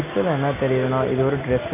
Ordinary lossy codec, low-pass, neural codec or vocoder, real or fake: none; 3.6 kHz; none; real